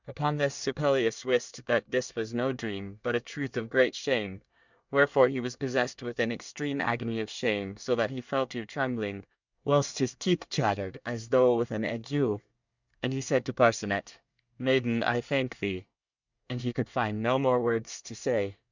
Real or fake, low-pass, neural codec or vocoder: fake; 7.2 kHz; codec, 24 kHz, 1 kbps, SNAC